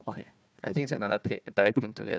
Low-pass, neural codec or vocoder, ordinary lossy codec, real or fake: none; codec, 16 kHz, 1 kbps, FunCodec, trained on Chinese and English, 50 frames a second; none; fake